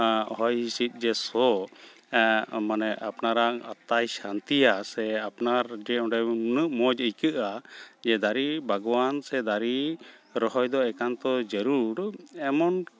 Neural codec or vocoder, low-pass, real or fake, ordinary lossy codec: none; none; real; none